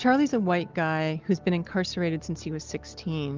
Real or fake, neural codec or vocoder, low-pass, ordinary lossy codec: real; none; 7.2 kHz; Opus, 32 kbps